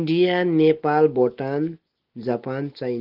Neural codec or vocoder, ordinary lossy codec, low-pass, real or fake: none; Opus, 16 kbps; 5.4 kHz; real